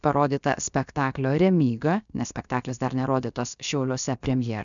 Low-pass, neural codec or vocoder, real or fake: 7.2 kHz; codec, 16 kHz, about 1 kbps, DyCAST, with the encoder's durations; fake